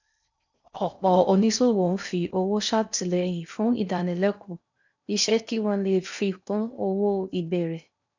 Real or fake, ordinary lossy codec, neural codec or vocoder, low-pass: fake; none; codec, 16 kHz in and 24 kHz out, 0.6 kbps, FocalCodec, streaming, 4096 codes; 7.2 kHz